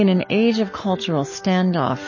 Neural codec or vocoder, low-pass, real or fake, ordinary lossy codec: codec, 44.1 kHz, 7.8 kbps, Pupu-Codec; 7.2 kHz; fake; MP3, 32 kbps